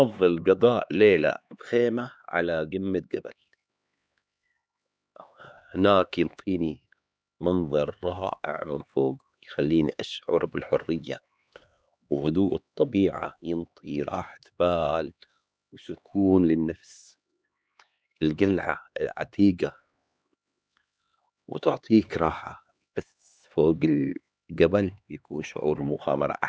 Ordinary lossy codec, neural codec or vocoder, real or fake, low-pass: none; codec, 16 kHz, 2 kbps, X-Codec, HuBERT features, trained on LibriSpeech; fake; none